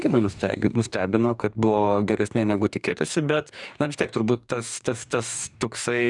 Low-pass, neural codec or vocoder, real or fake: 10.8 kHz; codec, 44.1 kHz, 2.6 kbps, DAC; fake